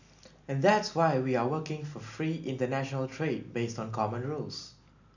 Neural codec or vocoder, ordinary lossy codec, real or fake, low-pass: none; none; real; 7.2 kHz